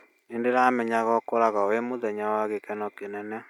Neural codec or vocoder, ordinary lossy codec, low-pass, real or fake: none; none; 19.8 kHz; real